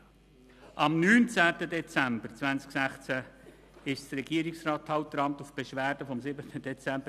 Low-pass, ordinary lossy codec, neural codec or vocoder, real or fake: 14.4 kHz; none; none; real